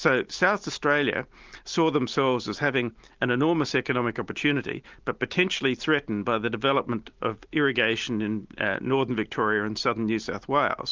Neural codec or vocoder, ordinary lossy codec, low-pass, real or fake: none; Opus, 32 kbps; 7.2 kHz; real